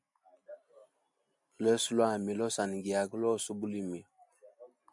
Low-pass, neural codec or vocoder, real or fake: 10.8 kHz; none; real